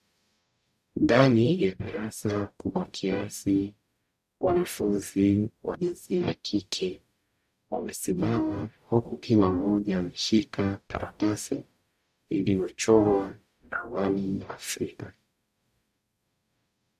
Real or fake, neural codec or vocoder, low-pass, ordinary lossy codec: fake; codec, 44.1 kHz, 0.9 kbps, DAC; 14.4 kHz; AAC, 96 kbps